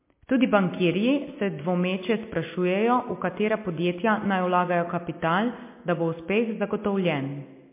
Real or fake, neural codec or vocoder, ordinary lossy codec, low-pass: real; none; MP3, 24 kbps; 3.6 kHz